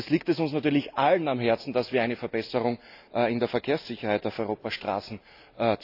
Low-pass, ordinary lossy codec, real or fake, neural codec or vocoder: 5.4 kHz; AAC, 48 kbps; real; none